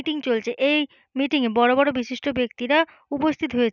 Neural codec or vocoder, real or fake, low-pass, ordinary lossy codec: none; real; 7.2 kHz; none